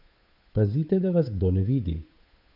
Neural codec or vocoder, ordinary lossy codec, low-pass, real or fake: codec, 16 kHz, 8 kbps, FunCodec, trained on Chinese and English, 25 frames a second; none; 5.4 kHz; fake